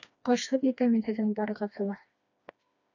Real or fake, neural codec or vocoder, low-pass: fake; codec, 16 kHz, 2 kbps, FreqCodec, smaller model; 7.2 kHz